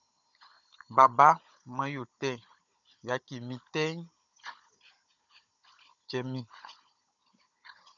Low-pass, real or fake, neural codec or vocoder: 7.2 kHz; fake; codec, 16 kHz, 16 kbps, FunCodec, trained on Chinese and English, 50 frames a second